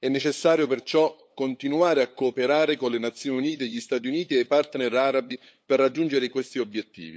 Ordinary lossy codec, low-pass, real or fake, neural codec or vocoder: none; none; fake; codec, 16 kHz, 8 kbps, FunCodec, trained on LibriTTS, 25 frames a second